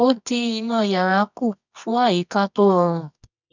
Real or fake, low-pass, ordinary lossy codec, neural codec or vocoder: fake; 7.2 kHz; none; codec, 24 kHz, 0.9 kbps, WavTokenizer, medium music audio release